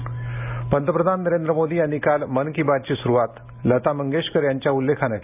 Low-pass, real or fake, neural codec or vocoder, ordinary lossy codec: 3.6 kHz; real; none; AAC, 32 kbps